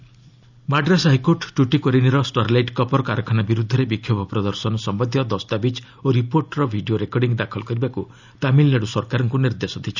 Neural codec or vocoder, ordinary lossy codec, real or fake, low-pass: none; none; real; 7.2 kHz